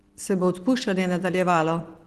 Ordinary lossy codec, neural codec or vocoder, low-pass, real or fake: Opus, 16 kbps; none; 14.4 kHz; real